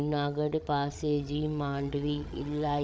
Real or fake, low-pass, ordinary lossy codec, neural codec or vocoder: fake; none; none; codec, 16 kHz, 16 kbps, FreqCodec, larger model